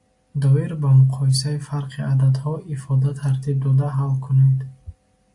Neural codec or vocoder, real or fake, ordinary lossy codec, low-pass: none; real; AAC, 64 kbps; 10.8 kHz